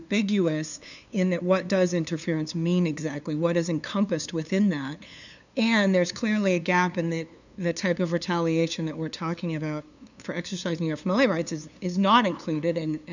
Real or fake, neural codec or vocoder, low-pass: fake; codec, 16 kHz, 2 kbps, FunCodec, trained on LibriTTS, 25 frames a second; 7.2 kHz